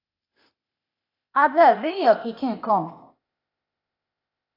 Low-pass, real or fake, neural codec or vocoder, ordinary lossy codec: 5.4 kHz; fake; codec, 16 kHz, 0.8 kbps, ZipCodec; AAC, 32 kbps